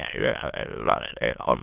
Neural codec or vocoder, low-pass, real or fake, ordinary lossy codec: autoencoder, 22.05 kHz, a latent of 192 numbers a frame, VITS, trained on many speakers; 3.6 kHz; fake; Opus, 32 kbps